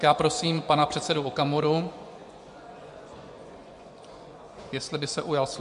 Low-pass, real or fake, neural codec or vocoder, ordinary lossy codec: 10.8 kHz; fake; vocoder, 24 kHz, 100 mel bands, Vocos; MP3, 64 kbps